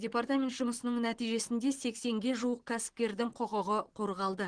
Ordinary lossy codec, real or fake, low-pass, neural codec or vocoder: Opus, 16 kbps; fake; 9.9 kHz; vocoder, 44.1 kHz, 128 mel bands, Pupu-Vocoder